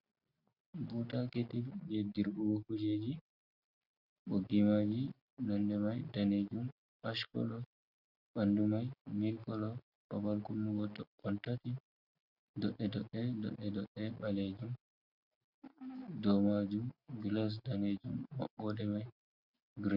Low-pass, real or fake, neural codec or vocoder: 5.4 kHz; real; none